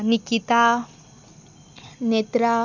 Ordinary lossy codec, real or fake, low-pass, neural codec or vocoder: none; real; 7.2 kHz; none